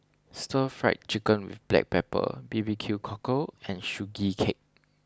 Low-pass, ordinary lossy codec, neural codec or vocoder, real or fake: none; none; none; real